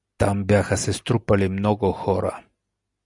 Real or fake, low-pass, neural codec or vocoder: real; 10.8 kHz; none